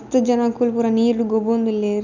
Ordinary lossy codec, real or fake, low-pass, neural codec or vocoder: none; real; 7.2 kHz; none